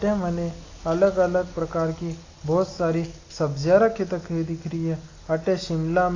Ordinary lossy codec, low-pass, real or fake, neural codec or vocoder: AAC, 32 kbps; 7.2 kHz; real; none